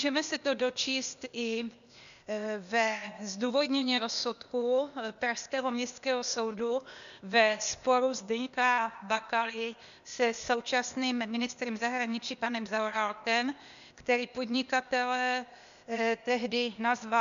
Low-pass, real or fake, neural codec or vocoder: 7.2 kHz; fake; codec, 16 kHz, 0.8 kbps, ZipCodec